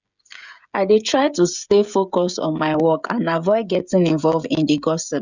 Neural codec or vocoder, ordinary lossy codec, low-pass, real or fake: codec, 16 kHz, 16 kbps, FreqCodec, smaller model; none; 7.2 kHz; fake